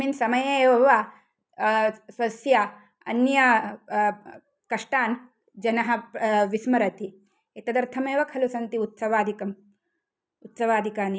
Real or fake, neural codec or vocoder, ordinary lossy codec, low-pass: real; none; none; none